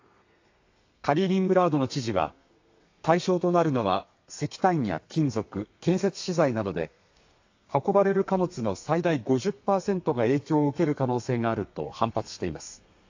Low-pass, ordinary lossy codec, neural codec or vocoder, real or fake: 7.2 kHz; AAC, 48 kbps; codec, 32 kHz, 1.9 kbps, SNAC; fake